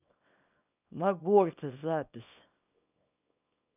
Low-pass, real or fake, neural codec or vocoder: 3.6 kHz; fake; codec, 24 kHz, 0.9 kbps, WavTokenizer, small release